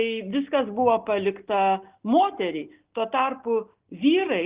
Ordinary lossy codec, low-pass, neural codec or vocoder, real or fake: Opus, 32 kbps; 3.6 kHz; none; real